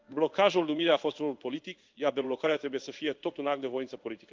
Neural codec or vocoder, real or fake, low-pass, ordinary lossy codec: codec, 16 kHz in and 24 kHz out, 1 kbps, XY-Tokenizer; fake; 7.2 kHz; Opus, 24 kbps